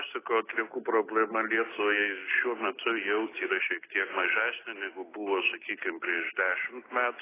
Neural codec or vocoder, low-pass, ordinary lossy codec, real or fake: none; 3.6 kHz; AAC, 16 kbps; real